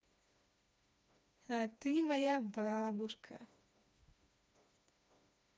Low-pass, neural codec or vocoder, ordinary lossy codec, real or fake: none; codec, 16 kHz, 2 kbps, FreqCodec, smaller model; none; fake